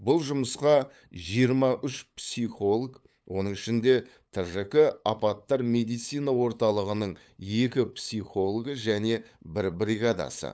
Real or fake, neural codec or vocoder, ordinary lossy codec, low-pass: fake; codec, 16 kHz, 8 kbps, FunCodec, trained on LibriTTS, 25 frames a second; none; none